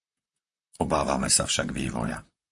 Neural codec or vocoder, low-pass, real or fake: vocoder, 44.1 kHz, 128 mel bands, Pupu-Vocoder; 10.8 kHz; fake